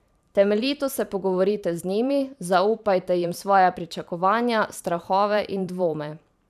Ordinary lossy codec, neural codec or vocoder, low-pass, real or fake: none; vocoder, 44.1 kHz, 128 mel bands every 512 samples, BigVGAN v2; 14.4 kHz; fake